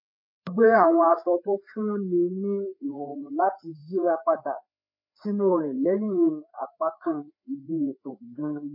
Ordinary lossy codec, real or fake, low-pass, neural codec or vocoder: MP3, 32 kbps; fake; 5.4 kHz; codec, 16 kHz, 4 kbps, FreqCodec, larger model